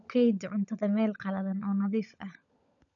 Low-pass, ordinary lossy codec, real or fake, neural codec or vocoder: 7.2 kHz; none; fake; codec, 16 kHz, 16 kbps, FunCodec, trained on LibriTTS, 50 frames a second